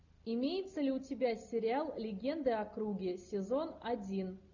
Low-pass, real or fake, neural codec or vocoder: 7.2 kHz; real; none